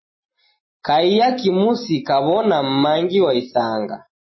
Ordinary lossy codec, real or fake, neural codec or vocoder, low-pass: MP3, 24 kbps; real; none; 7.2 kHz